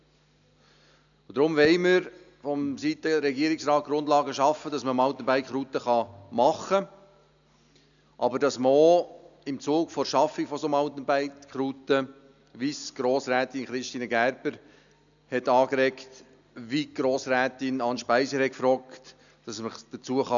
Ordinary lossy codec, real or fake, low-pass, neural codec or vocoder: none; real; 7.2 kHz; none